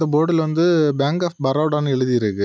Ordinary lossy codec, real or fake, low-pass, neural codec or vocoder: none; real; none; none